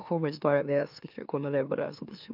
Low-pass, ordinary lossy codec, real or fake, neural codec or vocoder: 5.4 kHz; none; fake; autoencoder, 44.1 kHz, a latent of 192 numbers a frame, MeloTTS